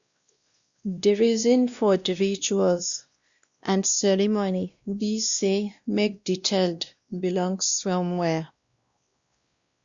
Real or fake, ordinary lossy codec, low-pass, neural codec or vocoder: fake; Opus, 64 kbps; 7.2 kHz; codec, 16 kHz, 1 kbps, X-Codec, WavLM features, trained on Multilingual LibriSpeech